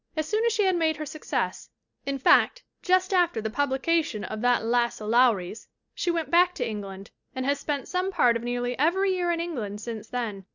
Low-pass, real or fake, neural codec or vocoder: 7.2 kHz; real; none